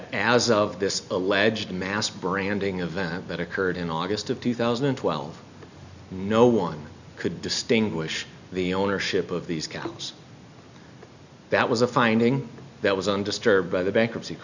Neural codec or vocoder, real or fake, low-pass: none; real; 7.2 kHz